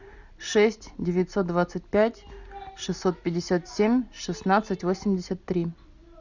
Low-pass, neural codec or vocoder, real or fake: 7.2 kHz; none; real